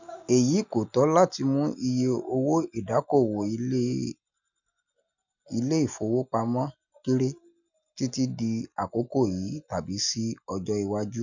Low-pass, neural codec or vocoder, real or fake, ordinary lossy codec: 7.2 kHz; none; real; none